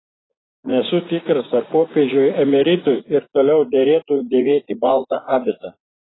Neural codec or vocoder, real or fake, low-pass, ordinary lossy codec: vocoder, 44.1 kHz, 80 mel bands, Vocos; fake; 7.2 kHz; AAC, 16 kbps